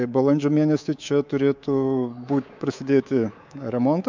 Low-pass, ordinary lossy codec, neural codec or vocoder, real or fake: 7.2 kHz; MP3, 64 kbps; codec, 24 kHz, 3.1 kbps, DualCodec; fake